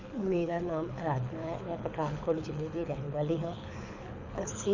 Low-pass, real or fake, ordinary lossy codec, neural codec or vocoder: 7.2 kHz; fake; none; codec, 24 kHz, 6 kbps, HILCodec